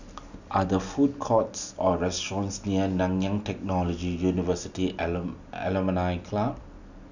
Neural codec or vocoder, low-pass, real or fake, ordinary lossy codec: none; 7.2 kHz; real; none